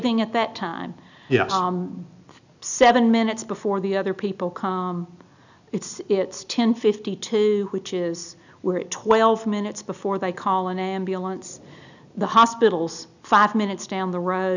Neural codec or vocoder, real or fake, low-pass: none; real; 7.2 kHz